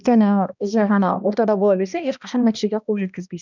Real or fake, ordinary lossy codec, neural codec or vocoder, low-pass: fake; none; codec, 16 kHz, 1 kbps, X-Codec, HuBERT features, trained on balanced general audio; 7.2 kHz